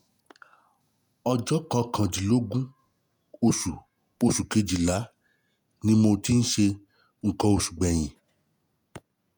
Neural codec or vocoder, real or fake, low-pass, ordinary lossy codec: vocoder, 48 kHz, 128 mel bands, Vocos; fake; none; none